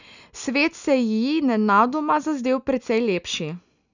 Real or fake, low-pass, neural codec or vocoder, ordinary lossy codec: real; 7.2 kHz; none; none